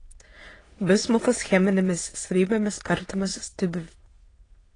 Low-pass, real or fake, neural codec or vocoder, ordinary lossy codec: 9.9 kHz; fake; autoencoder, 22.05 kHz, a latent of 192 numbers a frame, VITS, trained on many speakers; AAC, 32 kbps